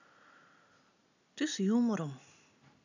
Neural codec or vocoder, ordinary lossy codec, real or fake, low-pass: none; none; real; 7.2 kHz